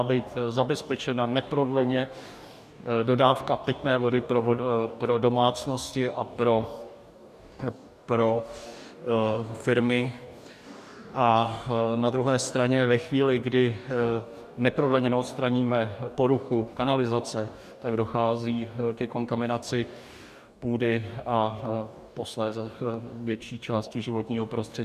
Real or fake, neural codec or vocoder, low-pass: fake; codec, 44.1 kHz, 2.6 kbps, DAC; 14.4 kHz